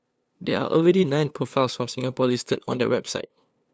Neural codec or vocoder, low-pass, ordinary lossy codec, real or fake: codec, 16 kHz, 2 kbps, FunCodec, trained on LibriTTS, 25 frames a second; none; none; fake